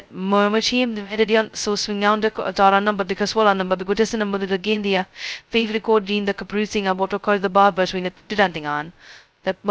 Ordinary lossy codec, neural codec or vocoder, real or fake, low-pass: none; codec, 16 kHz, 0.2 kbps, FocalCodec; fake; none